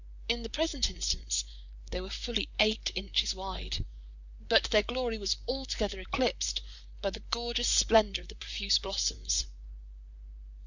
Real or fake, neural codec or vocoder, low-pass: fake; vocoder, 44.1 kHz, 128 mel bands, Pupu-Vocoder; 7.2 kHz